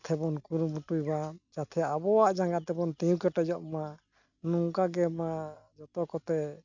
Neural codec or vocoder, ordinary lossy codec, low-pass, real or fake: none; none; 7.2 kHz; real